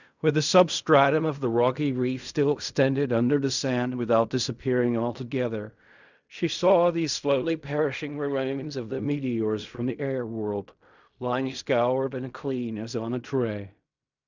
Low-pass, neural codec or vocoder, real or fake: 7.2 kHz; codec, 16 kHz in and 24 kHz out, 0.4 kbps, LongCat-Audio-Codec, fine tuned four codebook decoder; fake